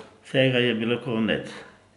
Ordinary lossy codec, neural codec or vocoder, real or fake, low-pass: none; none; real; 10.8 kHz